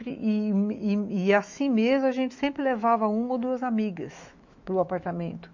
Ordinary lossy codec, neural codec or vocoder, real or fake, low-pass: MP3, 64 kbps; autoencoder, 48 kHz, 128 numbers a frame, DAC-VAE, trained on Japanese speech; fake; 7.2 kHz